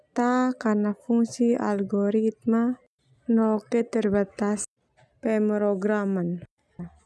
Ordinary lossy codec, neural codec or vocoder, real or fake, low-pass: none; none; real; none